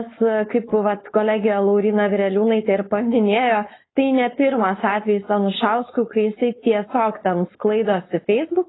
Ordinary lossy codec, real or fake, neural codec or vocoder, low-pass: AAC, 16 kbps; fake; codec, 16 kHz, 4.8 kbps, FACodec; 7.2 kHz